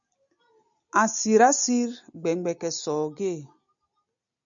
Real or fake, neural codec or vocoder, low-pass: real; none; 7.2 kHz